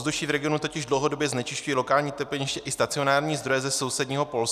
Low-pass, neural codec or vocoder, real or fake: 14.4 kHz; none; real